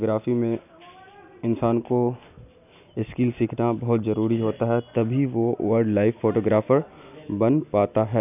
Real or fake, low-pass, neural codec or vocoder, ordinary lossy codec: real; 3.6 kHz; none; none